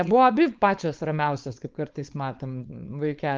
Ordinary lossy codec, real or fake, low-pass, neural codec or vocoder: Opus, 24 kbps; fake; 7.2 kHz; codec, 16 kHz, 4.8 kbps, FACodec